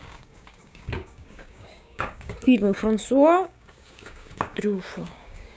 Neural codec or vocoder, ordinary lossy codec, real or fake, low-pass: codec, 16 kHz, 6 kbps, DAC; none; fake; none